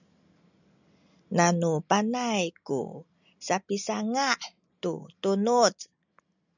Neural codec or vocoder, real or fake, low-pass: none; real; 7.2 kHz